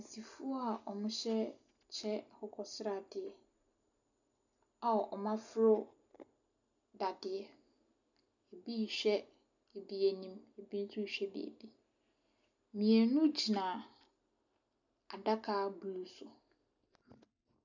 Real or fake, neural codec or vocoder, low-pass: real; none; 7.2 kHz